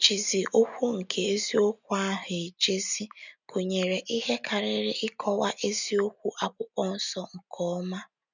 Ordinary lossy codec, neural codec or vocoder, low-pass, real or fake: none; none; 7.2 kHz; real